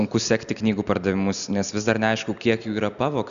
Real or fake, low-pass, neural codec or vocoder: real; 7.2 kHz; none